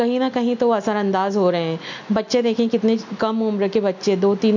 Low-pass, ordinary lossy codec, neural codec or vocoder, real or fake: 7.2 kHz; none; none; real